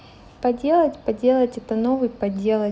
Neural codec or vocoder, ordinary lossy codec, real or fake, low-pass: none; none; real; none